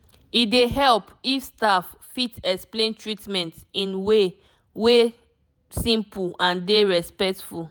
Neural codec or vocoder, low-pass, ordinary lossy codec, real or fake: vocoder, 48 kHz, 128 mel bands, Vocos; none; none; fake